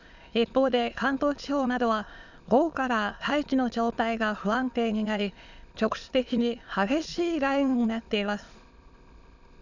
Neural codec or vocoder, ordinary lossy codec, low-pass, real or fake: autoencoder, 22.05 kHz, a latent of 192 numbers a frame, VITS, trained on many speakers; none; 7.2 kHz; fake